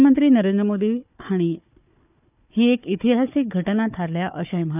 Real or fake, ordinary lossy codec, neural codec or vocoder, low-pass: fake; none; codec, 16 kHz, 4 kbps, FunCodec, trained on Chinese and English, 50 frames a second; 3.6 kHz